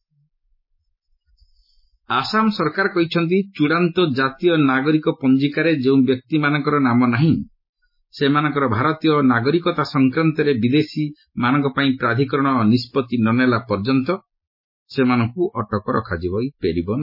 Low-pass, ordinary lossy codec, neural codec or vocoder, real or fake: 5.4 kHz; MP3, 24 kbps; none; real